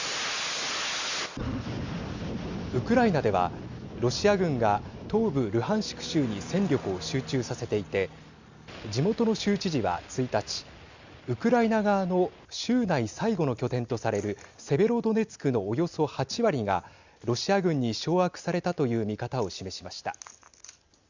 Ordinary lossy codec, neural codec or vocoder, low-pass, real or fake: Opus, 64 kbps; none; 7.2 kHz; real